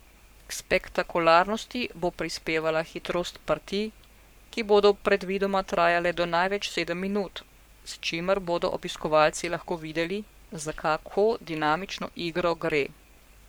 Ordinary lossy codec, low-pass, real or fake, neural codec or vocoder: none; none; fake; codec, 44.1 kHz, 7.8 kbps, Pupu-Codec